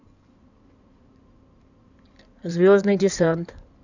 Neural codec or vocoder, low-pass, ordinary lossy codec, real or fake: codec, 16 kHz in and 24 kHz out, 2.2 kbps, FireRedTTS-2 codec; 7.2 kHz; none; fake